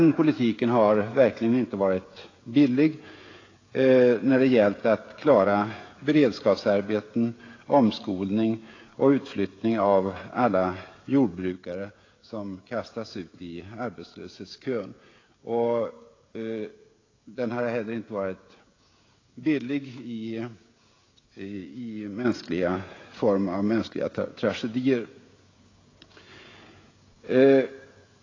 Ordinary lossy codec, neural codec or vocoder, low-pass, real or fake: AAC, 32 kbps; codec, 16 kHz, 16 kbps, FreqCodec, smaller model; 7.2 kHz; fake